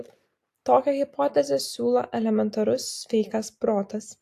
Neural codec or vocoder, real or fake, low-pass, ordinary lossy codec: none; real; 14.4 kHz; AAC, 64 kbps